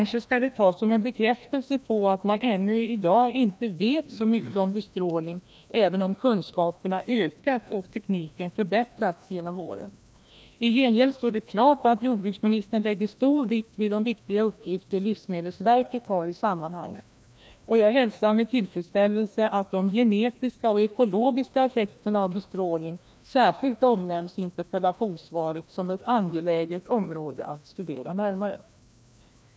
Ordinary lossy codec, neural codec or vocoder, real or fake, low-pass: none; codec, 16 kHz, 1 kbps, FreqCodec, larger model; fake; none